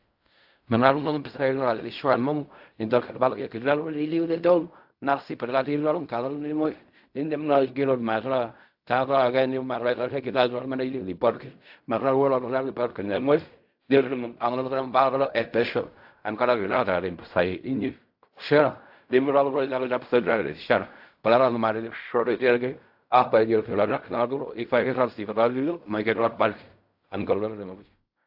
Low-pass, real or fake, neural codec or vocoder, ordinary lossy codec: 5.4 kHz; fake; codec, 16 kHz in and 24 kHz out, 0.4 kbps, LongCat-Audio-Codec, fine tuned four codebook decoder; AAC, 48 kbps